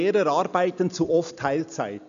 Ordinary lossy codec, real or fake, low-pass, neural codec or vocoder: none; real; 7.2 kHz; none